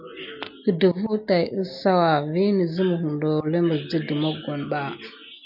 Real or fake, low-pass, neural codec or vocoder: real; 5.4 kHz; none